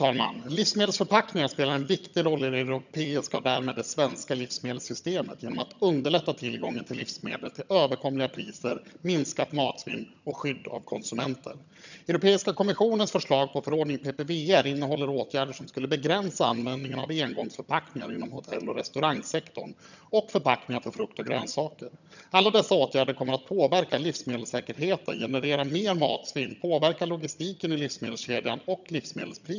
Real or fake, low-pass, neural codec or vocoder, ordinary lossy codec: fake; 7.2 kHz; vocoder, 22.05 kHz, 80 mel bands, HiFi-GAN; none